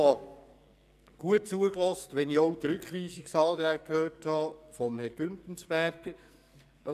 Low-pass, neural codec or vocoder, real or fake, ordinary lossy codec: 14.4 kHz; codec, 44.1 kHz, 2.6 kbps, SNAC; fake; none